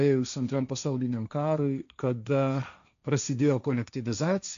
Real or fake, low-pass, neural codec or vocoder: fake; 7.2 kHz; codec, 16 kHz, 1.1 kbps, Voila-Tokenizer